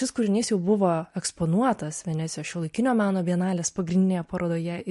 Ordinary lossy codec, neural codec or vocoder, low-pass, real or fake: MP3, 48 kbps; none; 14.4 kHz; real